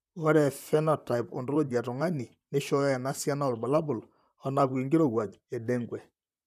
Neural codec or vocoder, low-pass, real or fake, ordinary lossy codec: vocoder, 44.1 kHz, 128 mel bands, Pupu-Vocoder; 14.4 kHz; fake; none